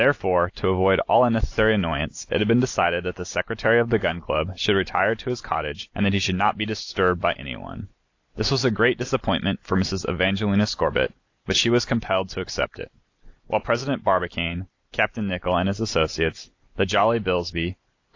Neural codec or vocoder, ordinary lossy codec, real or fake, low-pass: none; AAC, 48 kbps; real; 7.2 kHz